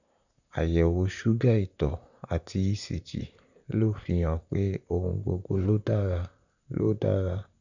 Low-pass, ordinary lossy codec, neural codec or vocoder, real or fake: 7.2 kHz; none; vocoder, 44.1 kHz, 128 mel bands, Pupu-Vocoder; fake